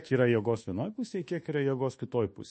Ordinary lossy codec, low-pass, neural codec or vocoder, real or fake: MP3, 32 kbps; 10.8 kHz; codec, 24 kHz, 1.2 kbps, DualCodec; fake